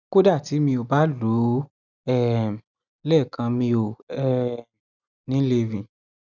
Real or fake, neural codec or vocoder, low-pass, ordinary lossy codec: real; none; 7.2 kHz; none